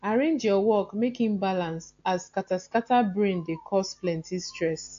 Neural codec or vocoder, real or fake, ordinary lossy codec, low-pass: none; real; AAC, 48 kbps; 7.2 kHz